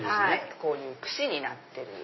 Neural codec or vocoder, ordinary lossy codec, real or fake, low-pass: none; MP3, 24 kbps; real; 7.2 kHz